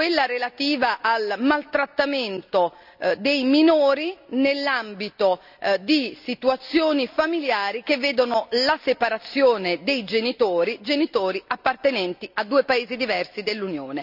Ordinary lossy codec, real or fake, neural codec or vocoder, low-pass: none; real; none; 5.4 kHz